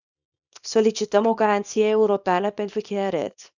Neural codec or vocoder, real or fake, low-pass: codec, 24 kHz, 0.9 kbps, WavTokenizer, small release; fake; 7.2 kHz